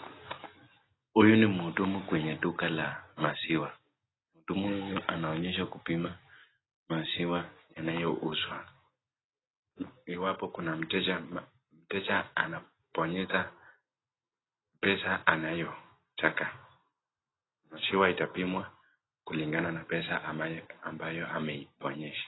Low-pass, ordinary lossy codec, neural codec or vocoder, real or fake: 7.2 kHz; AAC, 16 kbps; none; real